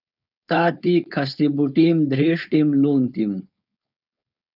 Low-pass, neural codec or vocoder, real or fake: 5.4 kHz; codec, 16 kHz, 4.8 kbps, FACodec; fake